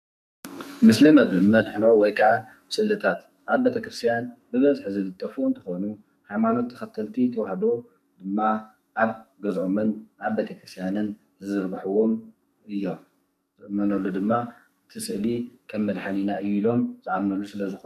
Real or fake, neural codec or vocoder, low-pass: fake; codec, 44.1 kHz, 2.6 kbps, SNAC; 14.4 kHz